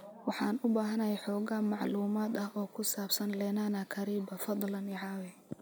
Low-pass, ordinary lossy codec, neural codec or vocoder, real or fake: none; none; none; real